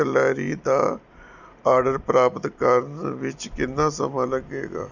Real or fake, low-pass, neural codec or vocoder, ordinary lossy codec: real; 7.2 kHz; none; none